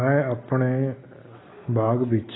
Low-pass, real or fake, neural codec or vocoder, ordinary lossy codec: 7.2 kHz; real; none; AAC, 16 kbps